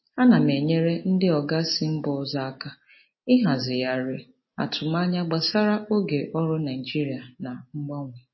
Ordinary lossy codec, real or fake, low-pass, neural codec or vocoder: MP3, 24 kbps; real; 7.2 kHz; none